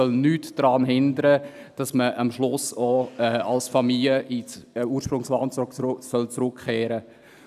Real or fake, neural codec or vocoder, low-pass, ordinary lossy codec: real; none; 14.4 kHz; none